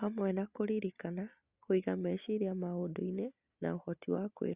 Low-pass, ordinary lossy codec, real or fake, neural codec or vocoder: 3.6 kHz; Opus, 64 kbps; real; none